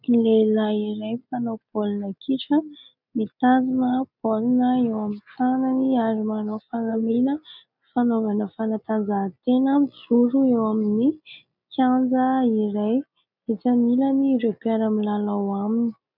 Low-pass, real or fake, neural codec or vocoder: 5.4 kHz; real; none